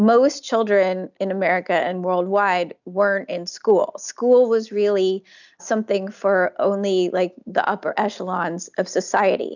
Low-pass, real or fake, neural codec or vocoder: 7.2 kHz; real; none